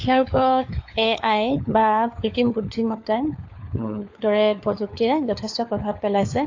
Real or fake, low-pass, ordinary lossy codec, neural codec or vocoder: fake; 7.2 kHz; AAC, 48 kbps; codec, 16 kHz, 4 kbps, FunCodec, trained on LibriTTS, 50 frames a second